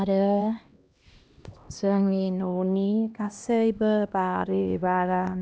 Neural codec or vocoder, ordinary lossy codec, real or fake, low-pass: codec, 16 kHz, 1 kbps, X-Codec, HuBERT features, trained on LibriSpeech; none; fake; none